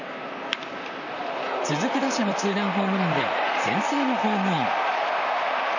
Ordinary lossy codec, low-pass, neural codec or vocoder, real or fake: none; 7.2 kHz; vocoder, 44.1 kHz, 128 mel bands, Pupu-Vocoder; fake